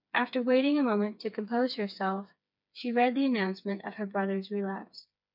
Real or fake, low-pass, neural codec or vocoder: fake; 5.4 kHz; codec, 16 kHz, 4 kbps, FreqCodec, smaller model